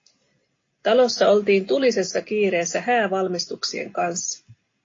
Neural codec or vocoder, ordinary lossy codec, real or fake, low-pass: none; AAC, 32 kbps; real; 7.2 kHz